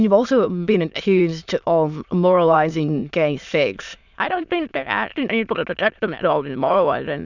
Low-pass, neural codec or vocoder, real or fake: 7.2 kHz; autoencoder, 22.05 kHz, a latent of 192 numbers a frame, VITS, trained on many speakers; fake